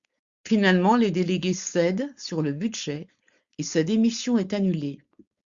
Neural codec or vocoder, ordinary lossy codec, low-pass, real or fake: codec, 16 kHz, 4.8 kbps, FACodec; Opus, 64 kbps; 7.2 kHz; fake